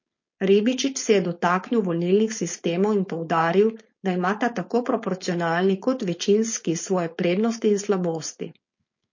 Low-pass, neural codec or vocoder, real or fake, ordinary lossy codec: 7.2 kHz; codec, 16 kHz, 4.8 kbps, FACodec; fake; MP3, 32 kbps